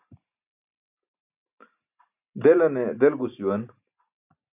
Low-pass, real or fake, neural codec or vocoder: 3.6 kHz; real; none